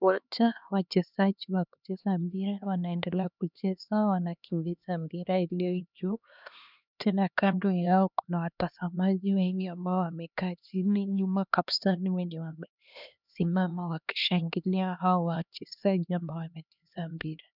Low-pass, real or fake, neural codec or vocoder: 5.4 kHz; fake; codec, 16 kHz, 2 kbps, X-Codec, HuBERT features, trained on LibriSpeech